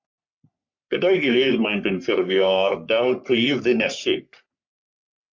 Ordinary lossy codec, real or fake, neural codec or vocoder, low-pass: MP3, 48 kbps; fake; codec, 44.1 kHz, 3.4 kbps, Pupu-Codec; 7.2 kHz